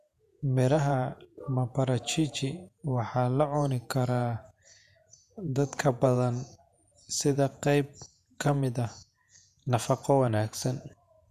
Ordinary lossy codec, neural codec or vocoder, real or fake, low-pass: none; vocoder, 48 kHz, 128 mel bands, Vocos; fake; 14.4 kHz